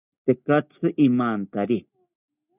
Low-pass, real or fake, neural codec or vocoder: 3.6 kHz; real; none